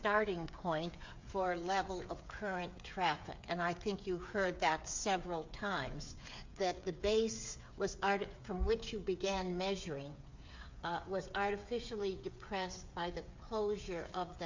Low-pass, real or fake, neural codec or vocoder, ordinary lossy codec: 7.2 kHz; fake; codec, 16 kHz, 8 kbps, FreqCodec, smaller model; MP3, 48 kbps